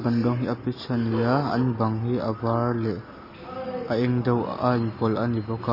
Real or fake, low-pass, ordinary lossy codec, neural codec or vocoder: real; 5.4 kHz; MP3, 24 kbps; none